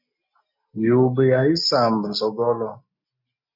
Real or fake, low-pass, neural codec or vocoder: real; 5.4 kHz; none